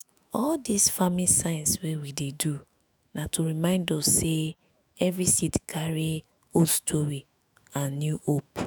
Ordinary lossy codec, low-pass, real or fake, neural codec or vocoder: none; none; fake; autoencoder, 48 kHz, 128 numbers a frame, DAC-VAE, trained on Japanese speech